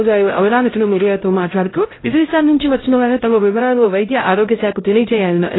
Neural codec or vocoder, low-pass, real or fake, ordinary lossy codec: codec, 16 kHz, 0.5 kbps, X-Codec, HuBERT features, trained on LibriSpeech; 7.2 kHz; fake; AAC, 16 kbps